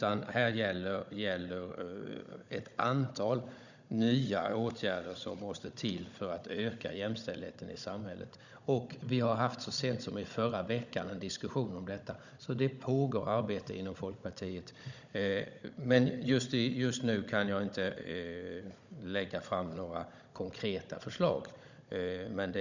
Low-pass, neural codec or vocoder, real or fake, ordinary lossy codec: 7.2 kHz; codec, 16 kHz, 16 kbps, FunCodec, trained on Chinese and English, 50 frames a second; fake; none